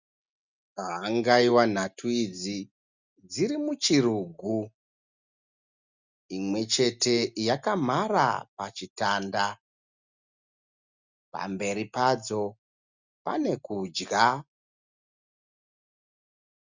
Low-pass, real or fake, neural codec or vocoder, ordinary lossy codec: 7.2 kHz; real; none; Opus, 64 kbps